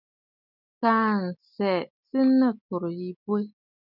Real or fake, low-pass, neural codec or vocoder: real; 5.4 kHz; none